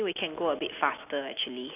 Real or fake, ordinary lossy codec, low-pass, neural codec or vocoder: real; none; 3.6 kHz; none